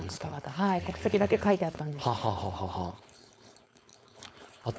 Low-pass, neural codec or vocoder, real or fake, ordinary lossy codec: none; codec, 16 kHz, 4.8 kbps, FACodec; fake; none